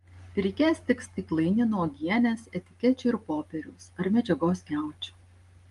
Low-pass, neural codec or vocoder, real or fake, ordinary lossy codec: 10.8 kHz; none; real; Opus, 24 kbps